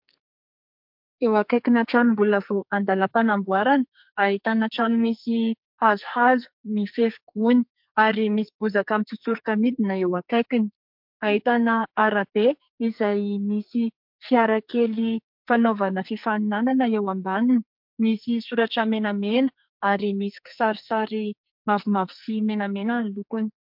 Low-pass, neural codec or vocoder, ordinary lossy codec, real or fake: 5.4 kHz; codec, 44.1 kHz, 2.6 kbps, SNAC; MP3, 48 kbps; fake